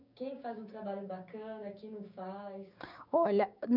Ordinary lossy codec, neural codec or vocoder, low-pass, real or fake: none; codec, 16 kHz, 6 kbps, DAC; 5.4 kHz; fake